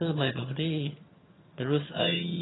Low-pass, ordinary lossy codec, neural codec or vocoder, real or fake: 7.2 kHz; AAC, 16 kbps; vocoder, 22.05 kHz, 80 mel bands, HiFi-GAN; fake